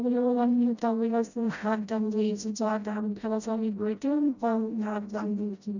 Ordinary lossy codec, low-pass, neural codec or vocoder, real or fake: none; 7.2 kHz; codec, 16 kHz, 0.5 kbps, FreqCodec, smaller model; fake